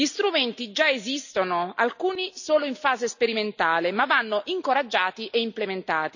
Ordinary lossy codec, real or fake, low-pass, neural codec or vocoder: none; real; 7.2 kHz; none